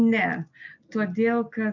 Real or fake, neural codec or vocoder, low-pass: real; none; 7.2 kHz